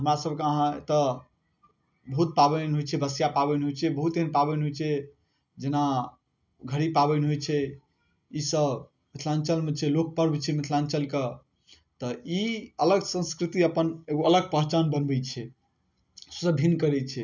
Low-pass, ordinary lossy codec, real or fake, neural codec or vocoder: 7.2 kHz; none; real; none